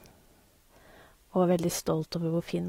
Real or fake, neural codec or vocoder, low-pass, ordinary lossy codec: fake; vocoder, 44.1 kHz, 128 mel bands every 512 samples, BigVGAN v2; 19.8 kHz; AAC, 48 kbps